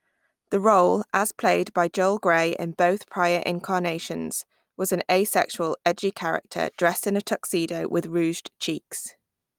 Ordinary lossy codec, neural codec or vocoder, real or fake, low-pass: Opus, 32 kbps; none; real; 19.8 kHz